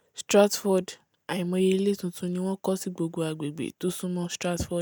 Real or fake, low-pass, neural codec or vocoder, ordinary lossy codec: real; none; none; none